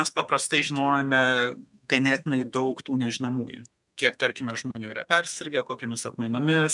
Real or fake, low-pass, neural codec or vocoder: fake; 10.8 kHz; codec, 32 kHz, 1.9 kbps, SNAC